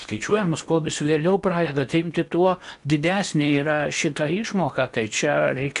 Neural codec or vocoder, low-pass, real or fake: codec, 16 kHz in and 24 kHz out, 0.8 kbps, FocalCodec, streaming, 65536 codes; 10.8 kHz; fake